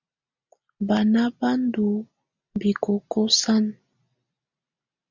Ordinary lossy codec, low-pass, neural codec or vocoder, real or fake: MP3, 64 kbps; 7.2 kHz; none; real